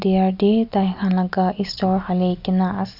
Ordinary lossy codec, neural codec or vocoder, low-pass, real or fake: none; none; 5.4 kHz; real